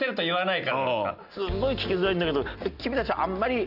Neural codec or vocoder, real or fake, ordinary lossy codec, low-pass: none; real; none; 5.4 kHz